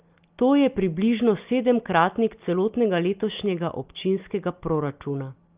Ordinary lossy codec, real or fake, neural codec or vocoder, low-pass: Opus, 24 kbps; real; none; 3.6 kHz